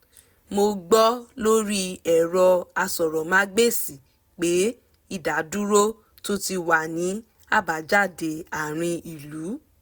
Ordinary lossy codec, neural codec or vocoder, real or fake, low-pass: none; none; real; none